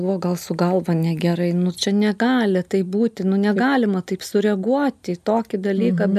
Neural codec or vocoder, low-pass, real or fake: none; 14.4 kHz; real